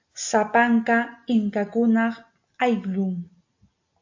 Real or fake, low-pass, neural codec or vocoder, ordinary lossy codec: real; 7.2 kHz; none; AAC, 48 kbps